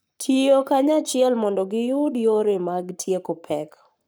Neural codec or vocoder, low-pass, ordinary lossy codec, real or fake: codec, 44.1 kHz, 7.8 kbps, Pupu-Codec; none; none; fake